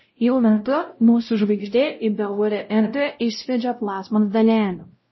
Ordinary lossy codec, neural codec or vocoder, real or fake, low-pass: MP3, 24 kbps; codec, 16 kHz, 0.5 kbps, X-Codec, WavLM features, trained on Multilingual LibriSpeech; fake; 7.2 kHz